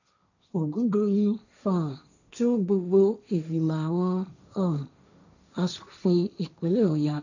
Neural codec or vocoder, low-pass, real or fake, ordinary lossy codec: codec, 16 kHz, 1.1 kbps, Voila-Tokenizer; 7.2 kHz; fake; none